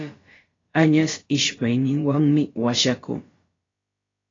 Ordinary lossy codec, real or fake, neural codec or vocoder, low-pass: AAC, 32 kbps; fake; codec, 16 kHz, about 1 kbps, DyCAST, with the encoder's durations; 7.2 kHz